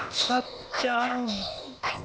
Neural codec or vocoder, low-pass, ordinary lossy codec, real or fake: codec, 16 kHz, 0.8 kbps, ZipCodec; none; none; fake